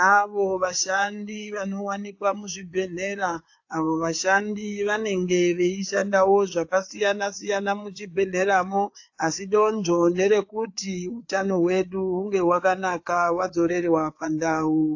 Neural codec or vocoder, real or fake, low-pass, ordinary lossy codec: codec, 16 kHz, 4 kbps, FreqCodec, larger model; fake; 7.2 kHz; AAC, 48 kbps